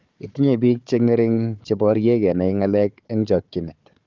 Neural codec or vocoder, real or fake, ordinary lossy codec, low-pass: codec, 16 kHz, 4 kbps, FunCodec, trained on Chinese and English, 50 frames a second; fake; Opus, 24 kbps; 7.2 kHz